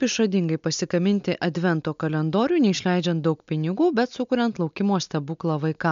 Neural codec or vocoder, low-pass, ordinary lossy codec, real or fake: none; 7.2 kHz; MP3, 64 kbps; real